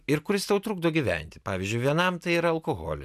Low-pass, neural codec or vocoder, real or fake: 14.4 kHz; none; real